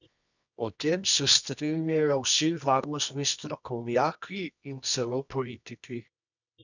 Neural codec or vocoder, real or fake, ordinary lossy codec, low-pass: codec, 24 kHz, 0.9 kbps, WavTokenizer, medium music audio release; fake; none; 7.2 kHz